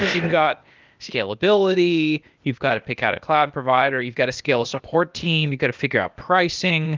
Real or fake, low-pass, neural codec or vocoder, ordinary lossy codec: fake; 7.2 kHz; codec, 16 kHz, 0.8 kbps, ZipCodec; Opus, 24 kbps